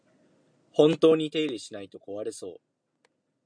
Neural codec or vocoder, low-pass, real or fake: none; 9.9 kHz; real